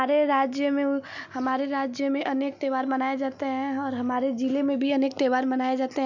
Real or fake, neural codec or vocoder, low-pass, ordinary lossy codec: real; none; 7.2 kHz; none